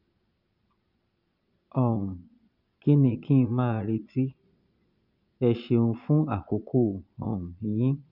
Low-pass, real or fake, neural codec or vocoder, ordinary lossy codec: 5.4 kHz; fake; vocoder, 22.05 kHz, 80 mel bands, Vocos; none